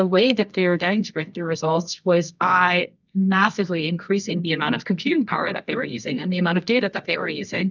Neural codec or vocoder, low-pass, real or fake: codec, 24 kHz, 0.9 kbps, WavTokenizer, medium music audio release; 7.2 kHz; fake